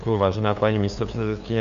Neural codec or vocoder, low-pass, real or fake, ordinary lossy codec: codec, 16 kHz, 2 kbps, FunCodec, trained on LibriTTS, 25 frames a second; 7.2 kHz; fake; AAC, 96 kbps